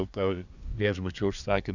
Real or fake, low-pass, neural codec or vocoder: fake; 7.2 kHz; codec, 24 kHz, 1 kbps, SNAC